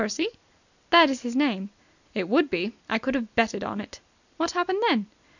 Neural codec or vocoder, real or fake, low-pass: none; real; 7.2 kHz